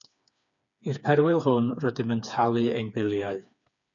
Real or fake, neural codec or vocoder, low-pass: fake; codec, 16 kHz, 4 kbps, FreqCodec, smaller model; 7.2 kHz